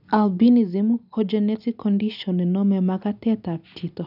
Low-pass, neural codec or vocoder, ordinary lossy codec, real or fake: 5.4 kHz; none; none; real